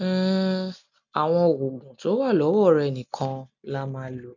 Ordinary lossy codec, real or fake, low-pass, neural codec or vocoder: none; real; 7.2 kHz; none